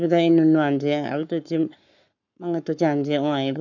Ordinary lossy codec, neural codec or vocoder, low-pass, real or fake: none; codec, 44.1 kHz, 7.8 kbps, Pupu-Codec; 7.2 kHz; fake